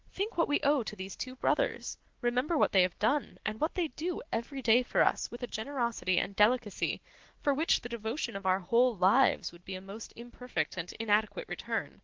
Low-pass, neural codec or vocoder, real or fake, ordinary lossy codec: 7.2 kHz; none; real; Opus, 16 kbps